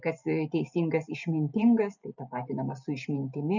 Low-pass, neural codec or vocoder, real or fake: 7.2 kHz; none; real